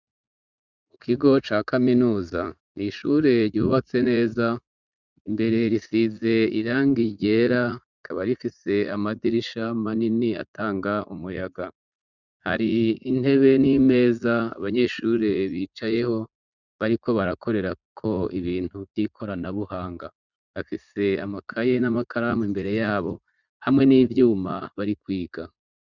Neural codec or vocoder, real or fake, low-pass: vocoder, 44.1 kHz, 80 mel bands, Vocos; fake; 7.2 kHz